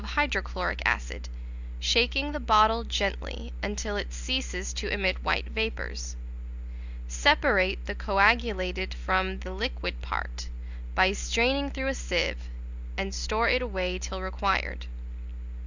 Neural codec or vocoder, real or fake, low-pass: none; real; 7.2 kHz